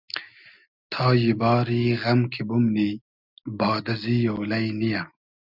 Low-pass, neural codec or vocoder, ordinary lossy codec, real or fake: 5.4 kHz; none; Opus, 64 kbps; real